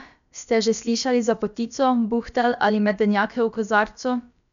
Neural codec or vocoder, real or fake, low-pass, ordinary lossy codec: codec, 16 kHz, about 1 kbps, DyCAST, with the encoder's durations; fake; 7.2 kHz; none